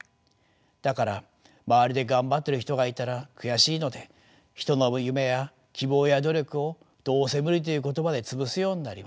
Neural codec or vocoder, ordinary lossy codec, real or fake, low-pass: none; none; real; none